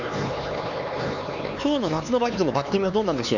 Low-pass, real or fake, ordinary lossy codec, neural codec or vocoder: 7.2 kHz; fake; none; codec, 16 kHz, 4 kbps, X-Codec, HuBERT features, trained on LibriSpeech